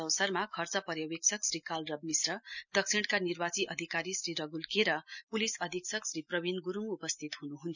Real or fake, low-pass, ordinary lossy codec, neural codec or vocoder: real; 7.2 kHz; none; none